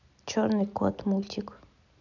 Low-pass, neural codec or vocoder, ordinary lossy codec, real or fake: 7.2 kHz; none; none; real